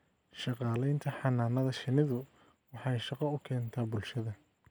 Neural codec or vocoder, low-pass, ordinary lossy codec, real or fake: none; none; none; real